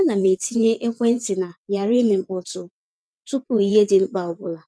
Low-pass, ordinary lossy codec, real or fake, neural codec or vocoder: none; none; fake; vocoder, 22.05 kHz, 80 mel bands, WaveNeXt